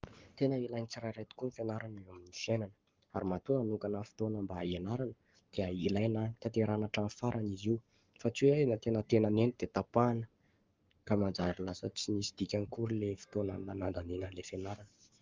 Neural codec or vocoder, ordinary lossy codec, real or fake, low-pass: codec, 44.1 kHz, 7.8 kbps, Pupu-Codec; Opus, 24 kbps; fake; 7.2 kHz